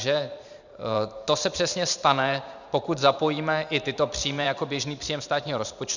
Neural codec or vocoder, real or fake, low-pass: vocoder, 44.1 kHz, 128 mel bands every 512 samples, BigVGAN v2; fake; 7.2 kHz